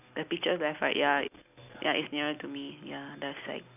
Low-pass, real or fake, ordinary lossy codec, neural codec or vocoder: 3.6 kHz; real; none; none